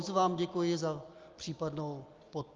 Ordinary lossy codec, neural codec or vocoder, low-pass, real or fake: Opus, 32 kbps; none; 7.2 kHz; real